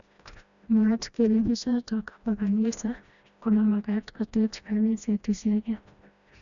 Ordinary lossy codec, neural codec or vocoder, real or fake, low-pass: none; codec, 16 kHz, 1 kbps, FreqCodec, smaller model; fake; 7.2 kHz